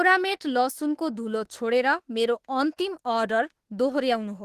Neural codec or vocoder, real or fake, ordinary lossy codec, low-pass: autoencoder, 48 kHz, 32 numbers a frame, DAC-VAE, trained on Japanese speech; fake; Opus, 16 kbps; 14.4 kHz